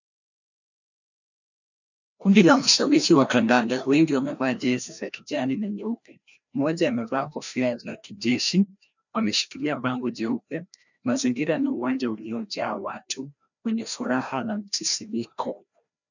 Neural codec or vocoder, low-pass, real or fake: codec, 16 kHz, 1 kbps, FreqCodec, larger model; 7.2 kHz; fake